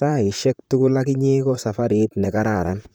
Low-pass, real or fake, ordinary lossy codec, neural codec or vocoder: none; fake; none; vocoder, 44.1 kHz, 128 mel bands, Pupu-Vocoder